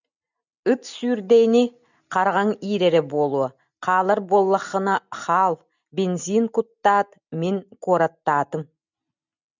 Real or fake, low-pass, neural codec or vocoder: real; 7.2 kHz; none